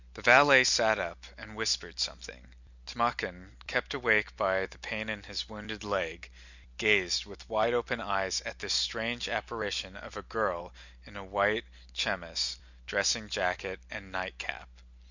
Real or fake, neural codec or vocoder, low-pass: real; none; 7.2 kHz